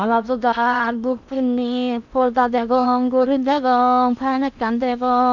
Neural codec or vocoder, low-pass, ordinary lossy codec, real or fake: codec, 16 kHz in and 24 kHz out, 0.8 kbps, FocalCodec, streaming, 65536 codes; 7.2 kHz; none; fake